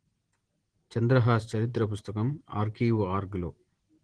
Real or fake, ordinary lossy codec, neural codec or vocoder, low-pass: real; Opus, 16 kbps; none; 9.9 kHz